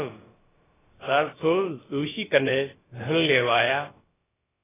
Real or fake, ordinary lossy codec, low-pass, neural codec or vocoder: fake; AAC, 16 kbps; 3.6 kHz; codec, 16 kHz, about 1 kbps, DyCAST, with the encoder's durations